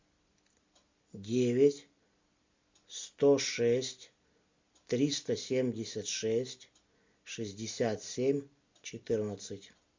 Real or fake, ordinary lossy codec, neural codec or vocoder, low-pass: real; MP3, 64 kbps; none; 7.2 kHz